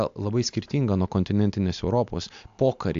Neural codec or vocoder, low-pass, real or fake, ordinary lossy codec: none; 7.2 kHz; real; AAC, 64 kbps